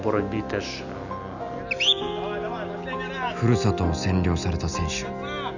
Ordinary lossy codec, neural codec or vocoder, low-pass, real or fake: none; none; 7.2 kHz; real